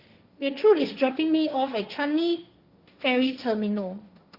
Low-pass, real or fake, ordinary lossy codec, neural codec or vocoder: 5.4 kHz; fake; Opus, 64 kbps; codec, 16 kHz, 1.1 kbps, Voila-Tokenizer